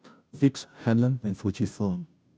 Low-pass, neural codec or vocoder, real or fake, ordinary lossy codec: none; codec, 16 kHz, 0.5 kbps, FunCodec, trained on Chinese and English, 25 frames a second; fake; none